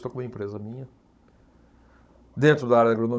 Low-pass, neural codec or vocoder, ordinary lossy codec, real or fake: none; codec, 16 kHz, 16 kbps, FunCodec, trained on Chinese and English, 50 frames a second; none; fake